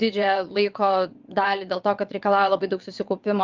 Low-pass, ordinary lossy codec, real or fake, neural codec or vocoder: 7.2 kHz; Opus, 24 kbps; fake; vocoder, 22.05 kHz, 80 mel bands, WaveNeXt